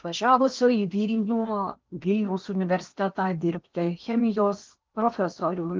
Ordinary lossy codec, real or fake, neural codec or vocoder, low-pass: Opus, 32 kbps; fake; codec, 16 kHz in and 24 kHz out, 0.8 kbps, FocalCodec, streaming, 65536 codes; 7.2 kHz